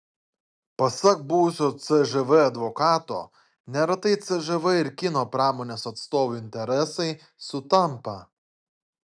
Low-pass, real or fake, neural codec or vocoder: 9.9 kHz; real; none